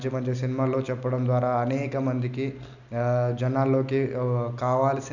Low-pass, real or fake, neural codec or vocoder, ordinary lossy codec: 7.2 kHz; real; none; none